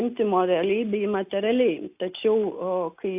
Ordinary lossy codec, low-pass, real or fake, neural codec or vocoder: AAC, 32 kbps; 3.6 kHz; real; none